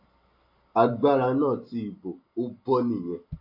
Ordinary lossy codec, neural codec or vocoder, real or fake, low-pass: MP3, 32 kbps; none; real; 5.4 kHz